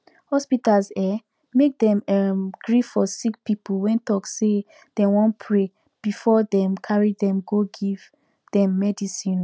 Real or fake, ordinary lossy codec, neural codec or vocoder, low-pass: real; none; none; none